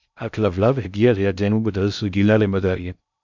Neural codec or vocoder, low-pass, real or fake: codec, 16 kHz in and 24 kHz out, 0.6 kbps, FocalCodec, streaming, 2048 codes; 7.2 kHz; fake